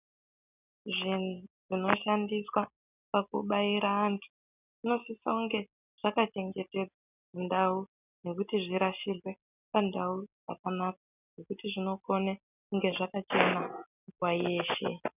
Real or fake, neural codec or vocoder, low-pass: real; none; 3.6 kHz